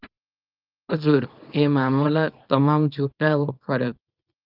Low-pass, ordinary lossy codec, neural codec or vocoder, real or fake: 5.4 kHz; Opus, 24 kbps; codec, 24 kHz, 0.9 kbps, WavTokenizer, small release; fake